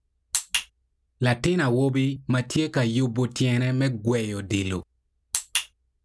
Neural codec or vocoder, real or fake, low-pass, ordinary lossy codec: none; real; none; none